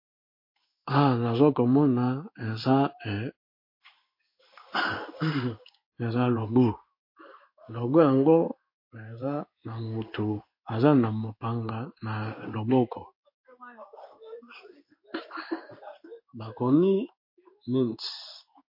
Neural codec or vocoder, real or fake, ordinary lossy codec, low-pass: codec, 16 kHz in and 24 kHz out, 1 kbps, XY-Tokenizer; fake; MP3, 32 kbps; 5.4 kHz